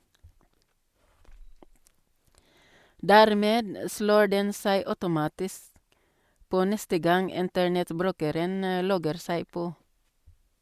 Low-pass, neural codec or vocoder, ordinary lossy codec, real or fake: 14.4 kHz; none; Opus, 64 kbps; real